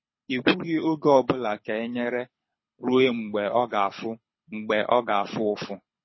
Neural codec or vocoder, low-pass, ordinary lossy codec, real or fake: codec, 24 kHz, 6 kbps, HILCodec; 7.2 kHz; MP3, 24 kbps; fake